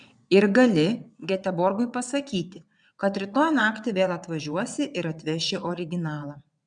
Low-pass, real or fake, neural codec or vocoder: 9.9 kHz; fake; vocoder, 22.05 kHz, 80 mel bands, WaveNeXt